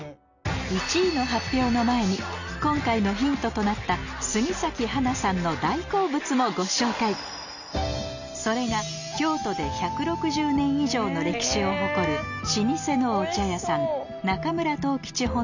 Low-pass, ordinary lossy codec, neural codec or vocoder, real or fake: 7.2 kHz; none; none; real